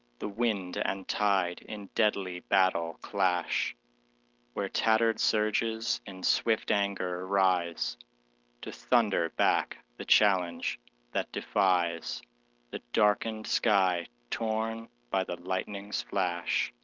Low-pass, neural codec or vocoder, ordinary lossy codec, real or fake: 7.2 kHz; none; Opus, 32 kbps; real